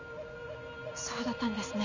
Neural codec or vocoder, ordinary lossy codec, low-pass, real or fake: none; none; 7.2 kHz; real